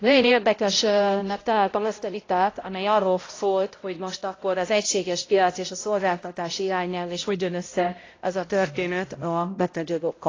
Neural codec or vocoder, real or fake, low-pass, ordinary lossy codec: codec, 16 kHz, 0.5 kbps, X-Codec, HuBERT features, trained on balanced general audio; fake; 7.2 kHz; AAC, 32 kbps